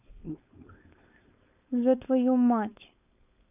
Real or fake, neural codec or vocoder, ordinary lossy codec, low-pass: fake; codec, 16 kHz, 4.8 kbps, FACodec; none; 3.6 kHz